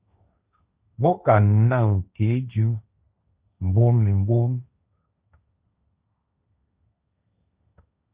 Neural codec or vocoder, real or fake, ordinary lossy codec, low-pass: codec, 16 kHz, 1.1 kbps, Voila-Tokenizer; fake; Opus, 64 kbps; 3.6 kHz